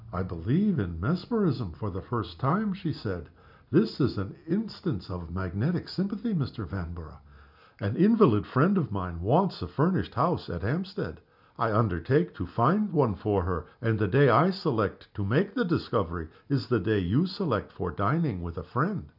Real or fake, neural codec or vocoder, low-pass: real; none; 5.4 kHz